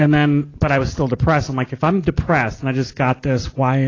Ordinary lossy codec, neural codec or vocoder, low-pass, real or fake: AAC, 32 kbps; none; 7.2 kHz; real